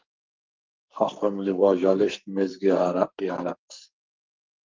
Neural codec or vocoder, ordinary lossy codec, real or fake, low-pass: codec, 44.1 kHz, 2.6 kbps, SNAC; Opus, 32 kbps; fake; 7.2 kHz